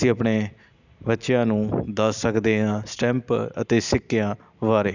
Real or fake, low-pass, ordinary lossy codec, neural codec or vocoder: real; 7.2 kHz; none; none